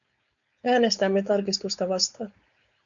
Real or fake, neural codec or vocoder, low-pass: fake; codec, 16 kHz, 4.8 kbps, FACodec; 7.2 kHz